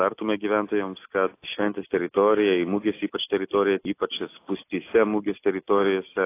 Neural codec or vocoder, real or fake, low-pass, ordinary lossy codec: none; real; 3.6 kHz; AAC, 24 kbps